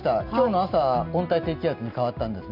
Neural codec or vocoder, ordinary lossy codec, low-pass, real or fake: none; none; 5.4 kHz; real